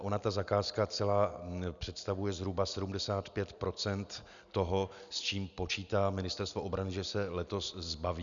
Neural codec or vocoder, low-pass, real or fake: none; 7.2 kHz; real